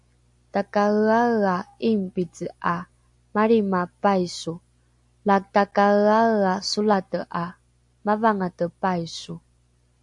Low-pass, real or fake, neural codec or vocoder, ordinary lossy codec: 10.8 kHz; real; none; AAC, 64 kbps